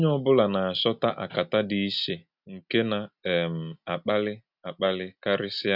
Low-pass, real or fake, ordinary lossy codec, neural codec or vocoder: 5.4 kHz; real; none; none